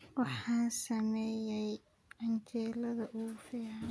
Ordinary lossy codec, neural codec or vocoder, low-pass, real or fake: none; none; none; real